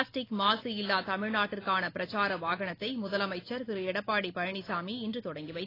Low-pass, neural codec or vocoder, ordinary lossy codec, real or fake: 5.4 kHz; none; AAC, 24 kbps; real